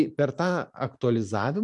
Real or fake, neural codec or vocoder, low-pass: fake; vocoder, 44.1 kHz, 128 mel bands every 512 samples, BigVGAN v2; 10.8 kHz